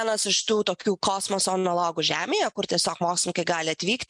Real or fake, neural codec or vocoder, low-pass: real; none; 10.8 kHz